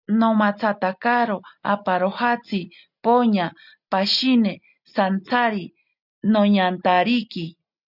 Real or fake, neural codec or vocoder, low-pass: real; none; 5.4 kHz